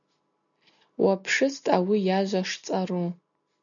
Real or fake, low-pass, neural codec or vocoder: real; 7.2 kHz; none